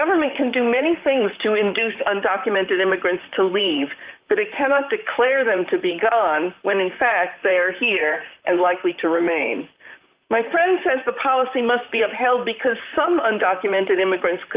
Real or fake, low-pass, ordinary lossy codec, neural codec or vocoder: fake; 3.6 kHz; Opus, 32 kbps; vocoder, 44.1 kHz, 128 mel bands, Pupu-Vocoder